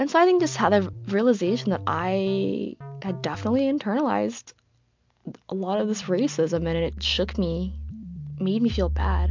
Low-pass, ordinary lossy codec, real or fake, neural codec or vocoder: 7.2 kHz; MP3, 64 kbps; real; none